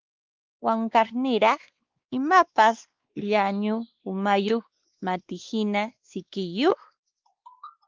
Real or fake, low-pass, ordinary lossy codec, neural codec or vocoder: fake; 7.2 kHz; Opus, 32 kbps; codec, 16 kHz, 2 kbps, X-Codec, WavLM features, trained on Multilingual LibriSpeech